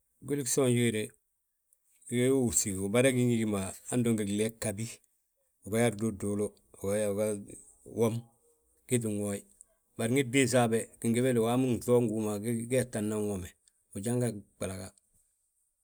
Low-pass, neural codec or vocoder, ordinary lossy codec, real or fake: none; none; none; real